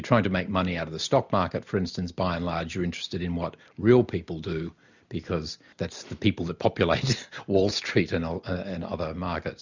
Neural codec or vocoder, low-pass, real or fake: none; 7.2 kHz; real